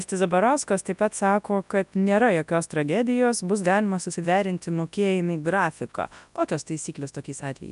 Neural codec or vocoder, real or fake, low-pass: codec, 24 kHz, 0.9 kbps, WavTokenizer, large speech release; fake; 10.8 kHz